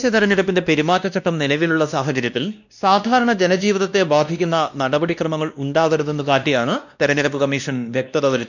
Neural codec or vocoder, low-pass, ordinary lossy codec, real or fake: codec, 16 kHz, 1 kbps, X-Codec, WavLM features, trained on Multilingual LibriSpeech; 7.2 kHz; none; fake